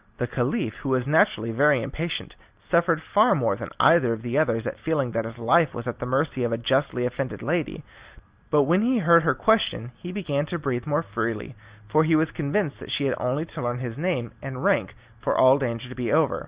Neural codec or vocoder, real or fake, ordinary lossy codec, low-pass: none; real; Opus, 64 kbps; 3.6 kHz